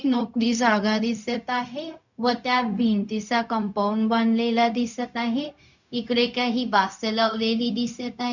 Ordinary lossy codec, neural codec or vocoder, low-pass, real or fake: none; codec, 16 kHz, 0.4 kbps, LongCat-Audio-Codec; 7.2 kHz; fake